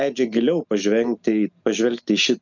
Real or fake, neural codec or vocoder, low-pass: real; none; 7.2 kHz